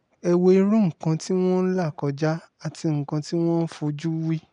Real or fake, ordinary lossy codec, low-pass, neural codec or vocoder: real; none; 9.9 kHz; none